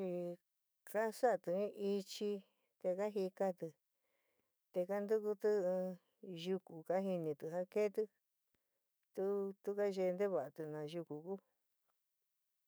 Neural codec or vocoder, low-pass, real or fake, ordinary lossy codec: autoencoder, 48 kHz, 128 numbers a frame, DAC-VAE, trained on Japanese speech; none; fake; none